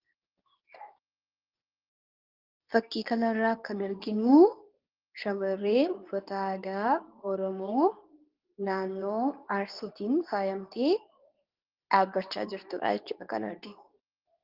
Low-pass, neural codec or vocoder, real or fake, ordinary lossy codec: 5.4 kHz; codec, 24 kHz, 0.9 kbps, WavTokenizer, medium speech release version 2; fake; Opus, 32 kbps